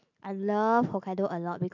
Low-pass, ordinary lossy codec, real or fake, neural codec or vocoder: 7.2 kHz; MP3, 48 kbps; real; none